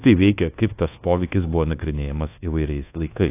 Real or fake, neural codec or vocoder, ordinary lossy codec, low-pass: fake; codec, 24 kHz, 1.2 kbps, DualCodec; AAC, 24 kbps; 3.6 kHz